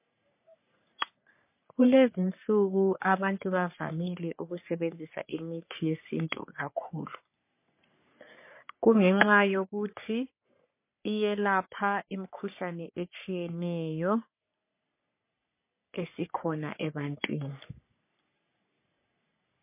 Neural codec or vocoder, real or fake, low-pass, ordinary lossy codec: codec, 44.1 kHz, 3.4 kbps, Pupu-Codec; fake; 3.6 kHz; MP3, 24 kbps